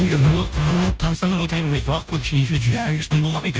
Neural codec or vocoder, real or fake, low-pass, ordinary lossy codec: codec, 16 kHz, 0.5 kbps, FunCodec, trained on Chinese and English, 25 frames a second; fake; none; none